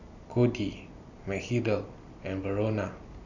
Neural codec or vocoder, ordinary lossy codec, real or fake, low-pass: none; none; real; 7.2 kHz